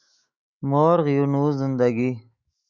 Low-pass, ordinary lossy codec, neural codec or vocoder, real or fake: 7.2 kHz; Opus, 64 kbps; autoencoder, 48 kHz, 128 numbers a frame, DAC-VAE, trained on Japanese speech; fake